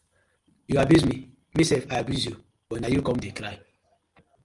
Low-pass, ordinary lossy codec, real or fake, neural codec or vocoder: 10.8 kHz; Opus, 32 kbps; real; none